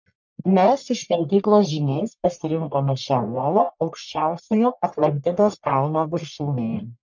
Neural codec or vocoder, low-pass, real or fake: codec, 44.1 kHz, 1.7 kbps, Pupu-Codec; 7.2 kHz; fake